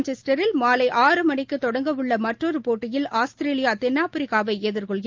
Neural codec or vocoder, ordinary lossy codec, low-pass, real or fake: none; Opus, 32 kbps; 7.2 kHz; real